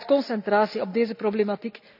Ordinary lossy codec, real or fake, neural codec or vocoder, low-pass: none; real; none; 5.4 kHz